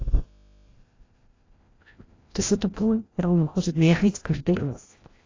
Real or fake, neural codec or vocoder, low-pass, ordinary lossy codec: fake; codec, 16 kHz, 0.5 kbps, FreqCodec, larger model; 7.2 kHz; AAC, 32 kbps